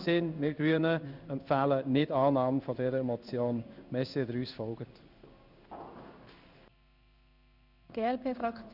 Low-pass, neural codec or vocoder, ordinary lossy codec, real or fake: 5.4 kHz; codec, 16 kHz in and 24 kHz out, 1 kbps, XY-Tokenizer; none; fake